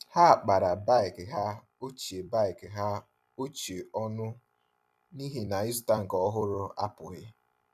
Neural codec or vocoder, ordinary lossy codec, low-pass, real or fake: vocoder, 44.1 kHz, 128 mel bands every 256 samples, BigVGAN v2; none; 14.4 kHz; fake